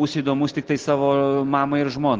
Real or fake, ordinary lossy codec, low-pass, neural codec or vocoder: real; Opus, 16 kbps; 7.2 kHz; none